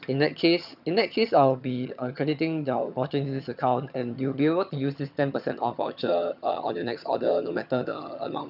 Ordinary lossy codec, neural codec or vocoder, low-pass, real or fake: none; vocoder, 22.05 kHz, 80 mel bands, HiFi-GAN; 5.4 kHz; fake